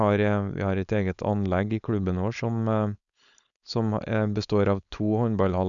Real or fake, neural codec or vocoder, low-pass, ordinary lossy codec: fake; codec, 16 kHz, 4.8 kbps, FACodec; 7.2 kHz; none